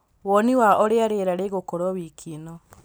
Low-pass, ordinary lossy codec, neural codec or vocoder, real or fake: none; none; none; real